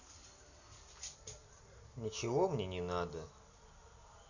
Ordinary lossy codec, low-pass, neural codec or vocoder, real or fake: none; 7.2 kHz; none; real